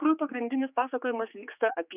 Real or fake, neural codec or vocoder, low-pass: fake; codec, 16 kHz, 4 kbps, X-Codec, HuBERT features, trained on general audio; 3.6 kHz